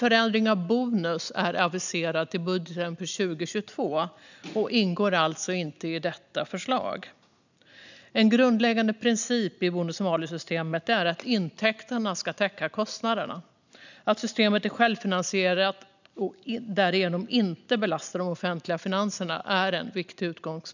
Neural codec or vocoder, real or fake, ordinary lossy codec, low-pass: none; real; none; 7.2 kHz